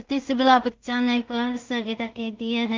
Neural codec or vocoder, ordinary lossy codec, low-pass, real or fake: codec, 16 kHz in and 24 kHz out, 0.4 kbps, LongCat-Audio-Codec, two codebook decoder; Opus, 24 kbps; 7.2 kHz; fake